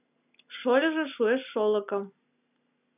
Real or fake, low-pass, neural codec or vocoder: real; 3.6 kHz; none